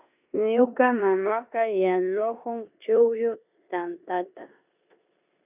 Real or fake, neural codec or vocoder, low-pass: fake; codec, 16 kHz in and 24 kHz out, 0.9 kbps, LongCat-Audio-Codec, four codebook decoder; 3.6 kHz